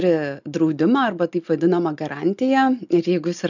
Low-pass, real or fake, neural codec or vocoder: 7.2 kHz; real; none